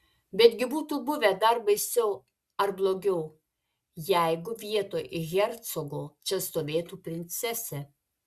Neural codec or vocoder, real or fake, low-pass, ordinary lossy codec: none; real; 14.4 kHz; Opus, 64 kbps